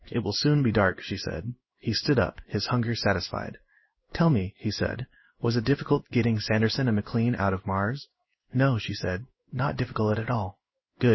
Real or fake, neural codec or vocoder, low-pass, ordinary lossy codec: fake; codec, 16 kHz in and 24 kHz out, 1 kbps, XY-Tokenizer; 7.2 kHz; MP3, 24 kbps